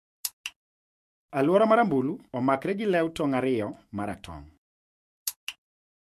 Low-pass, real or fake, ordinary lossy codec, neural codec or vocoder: 14.4 kHz; fake; MP3, 64 kbps; autoencoder, 48 kHz, 128 numbers a frame, DAC-VAE, trained on Japanese speech